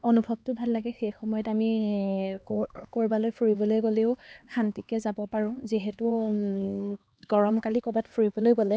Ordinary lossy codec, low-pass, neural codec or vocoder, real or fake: none; none; codec, 16 kHz, 2 kbps, X-Codec, HuBERT features, trained on LibriSpeech; fake